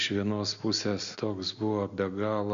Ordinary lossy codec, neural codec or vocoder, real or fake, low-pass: Opus, 64 kbps; none; real; 7.2 kHz